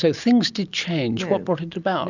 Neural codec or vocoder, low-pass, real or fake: none; 7.2 kHz; real